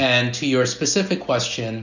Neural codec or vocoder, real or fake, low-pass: none; real; 7.2 kHz